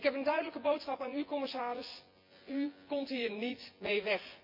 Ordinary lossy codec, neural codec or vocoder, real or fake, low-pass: MP3, 32 kbps; vocoder, 24 kHz, 100 mel bands, Vocos; fake; 5.4 kHz